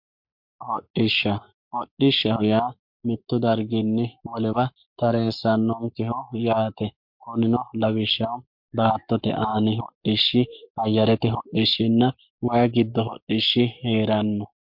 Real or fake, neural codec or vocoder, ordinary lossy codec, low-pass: fake; codec, 44.1 kHz, 7.8 kbps, Pupu-Codec; MP3, 48 kbps; 5.4 kHz